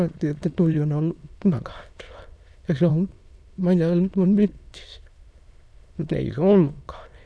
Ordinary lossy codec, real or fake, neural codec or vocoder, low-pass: none; fake; autoencoder, 22.05 kHz, a latent of 192 numbers a frame, VITS, trained on many speakers; none